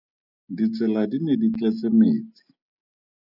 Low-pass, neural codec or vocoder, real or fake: 5.4 kHz; none; real